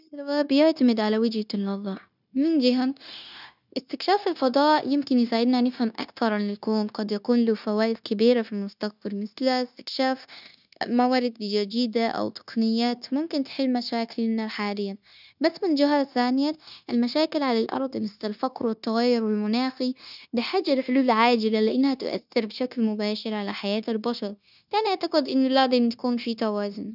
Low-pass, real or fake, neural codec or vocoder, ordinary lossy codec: 5.4 kHz; fake; codec, 16 kHz, 0.9 kbps, LongCat-Audio-Codec; none